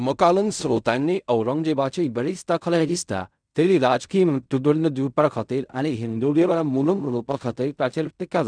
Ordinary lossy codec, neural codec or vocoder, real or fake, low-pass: none; codec, 16 kHz in and 24 kHz out, 0.4 kbps, LongCat-Audio-Codec, fine tuned four codebook decoder; fake; 9.9 kHz